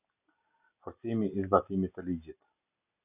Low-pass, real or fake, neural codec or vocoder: 3.6 kHz; real; none